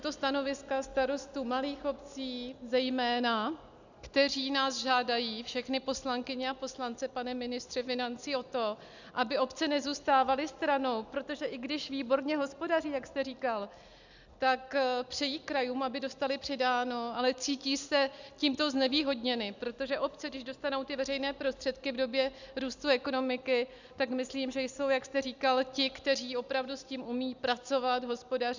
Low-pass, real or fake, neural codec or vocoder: 7.2 kHz; real; none